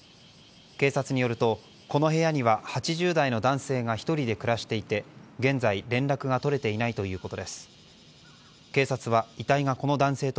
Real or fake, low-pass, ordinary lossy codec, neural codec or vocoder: real; none; none; none